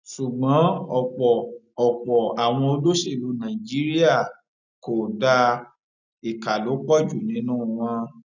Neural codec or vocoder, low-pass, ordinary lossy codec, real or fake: none; 7.2 kHz; none; real